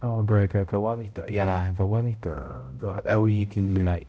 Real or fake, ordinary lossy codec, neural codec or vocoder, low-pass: fake; none; codec, 16 kHz, 0.5 kbps, X-Codec, HuBERT features, trained on balanced general audio; none